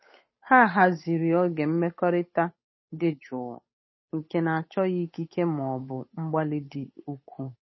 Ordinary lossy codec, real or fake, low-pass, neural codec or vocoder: MP3, 24 kbps; fake; 7.2 kHz; codec, 16 kHz, 8 kbps, FunCodec, trained on Chinese and English, 25 frames a second